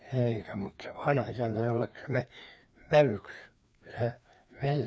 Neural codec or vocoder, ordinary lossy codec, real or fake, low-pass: codec, 16 kHz, 2 kbps, FreqCodec, larger model; none; fake; none